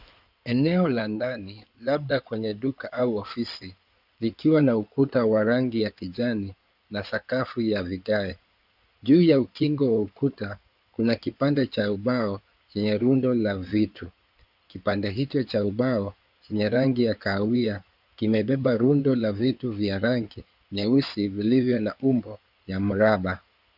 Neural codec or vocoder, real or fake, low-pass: codec, 16 kHz in and 24 kHz out, 2.2 kbps, FireRedTTS-2 codec; fake; 5.4 kHz